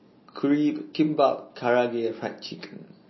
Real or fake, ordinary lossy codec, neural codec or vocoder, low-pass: real; MP3, 24 kbps; none; 7.2 kHz